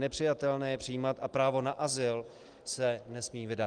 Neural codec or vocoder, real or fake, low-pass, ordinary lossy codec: none; real; 9.9 kHz; Opus, 24 kbps